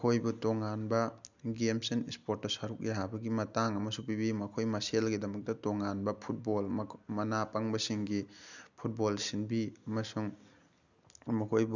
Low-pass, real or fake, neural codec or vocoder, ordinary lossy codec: 7.2 kHz; real; none; none